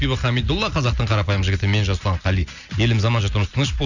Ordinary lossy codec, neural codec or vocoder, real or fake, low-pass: none; none; real; 7.2 kHz